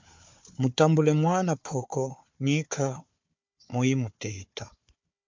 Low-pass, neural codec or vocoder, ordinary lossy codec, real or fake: 7.2 kHz; codec, 16 kHz, 4 kbps, FunCodec, trained on Chinese and English, 50 frames a second; MP3, 64 kbps; fake